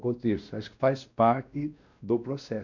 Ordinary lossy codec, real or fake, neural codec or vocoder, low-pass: none; fake; codec, 16 kHz, 1 kbps, X-Codec, WavLM features, trained on Multilingual LibriSpeech; 7.2 kHz